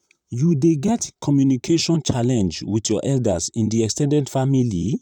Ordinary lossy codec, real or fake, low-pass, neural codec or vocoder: none; fake; 19.8 kHz; vocoder, 44.1 kHz, 128 mel bands, Pupu-Vocoder